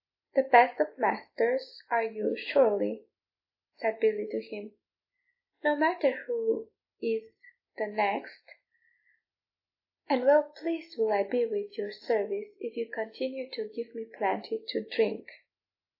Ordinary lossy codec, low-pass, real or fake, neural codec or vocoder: AAC, 32 kbps; 5.4 kHz; real; none